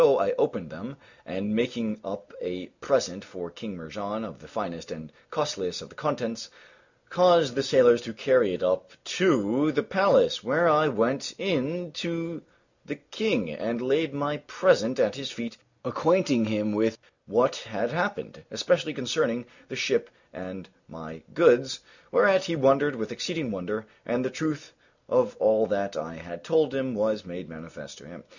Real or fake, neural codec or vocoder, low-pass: real; none; 7.2 kHz